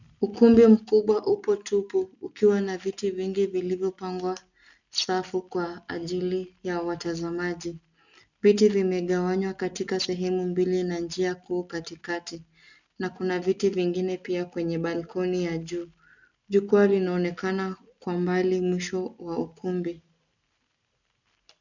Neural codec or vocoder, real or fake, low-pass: none; real; 7.2 kHz